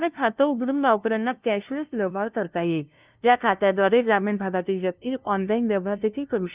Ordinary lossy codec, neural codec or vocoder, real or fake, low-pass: Opus, 32 kbps; codec, 16 kHz, 0.5 kbps, FunCodec, trained on LibriTTS, 25 frames a second; fake; 3.6 kHz